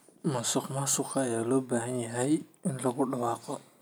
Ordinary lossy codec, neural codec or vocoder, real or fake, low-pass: none; none; real; none